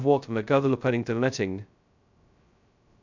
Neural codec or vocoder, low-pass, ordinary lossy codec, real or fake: codec, 16 kHz, 0.2 kbps, FocalCodec; 7.2 kHz; none; fake